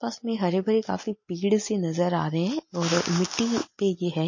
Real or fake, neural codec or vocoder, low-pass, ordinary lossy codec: real; none; 7.2 kHz; MP3, 32 kbps